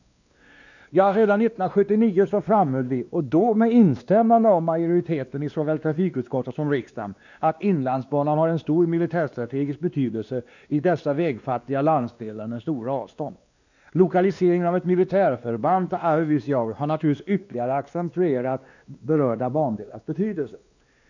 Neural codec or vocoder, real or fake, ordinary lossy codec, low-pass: codec, 16 kHz, 2 kbps, X-Codec, WavLM features, trained on Multilingual LibriSpeech; fake; none; 7.2 kHz